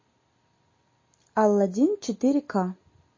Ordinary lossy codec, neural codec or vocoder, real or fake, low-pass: MP3, 32 kbps; none; real; 7.2 kHz